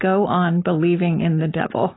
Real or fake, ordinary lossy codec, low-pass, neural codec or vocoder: real; AAC, 16 kbps; 7.2 kHz; none